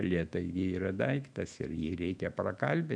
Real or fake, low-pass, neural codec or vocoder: fake; 9.9 kHz; autoencoder, 48 kHz, 128 numbers a frame, DAC-VAE, trained on Japanese speech